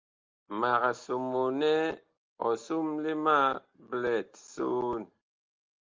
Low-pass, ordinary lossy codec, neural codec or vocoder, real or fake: 7.2 kHz; Opus, 32 kbps; none; real